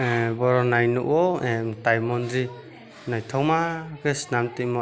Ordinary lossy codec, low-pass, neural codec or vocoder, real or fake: none; none; none; real